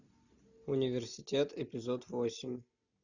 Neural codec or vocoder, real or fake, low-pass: none; real; 7.2 kHz